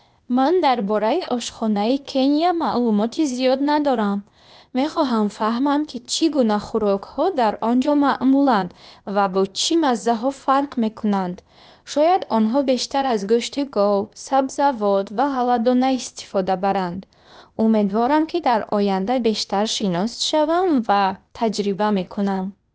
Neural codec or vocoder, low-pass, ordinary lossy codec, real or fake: codec, 16 kHz, 0.8 kbps, ZipCodec; none; none; fake